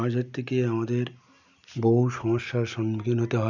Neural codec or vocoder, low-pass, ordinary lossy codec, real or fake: none; 7.2 kHz; none; real